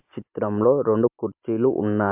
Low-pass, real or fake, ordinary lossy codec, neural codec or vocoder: 3.6 kHz; real; MP3, 32 kbps; none